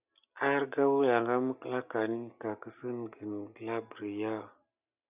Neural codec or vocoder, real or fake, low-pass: none; real; 3.6 kHz